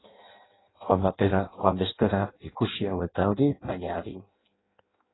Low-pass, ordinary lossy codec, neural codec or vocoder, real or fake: 7.2 kHz; AAC, 16 kbps; codec, 16 kHz in and 24 kHz out, 0.6 kbps, FireRedTTS-2 codec; fake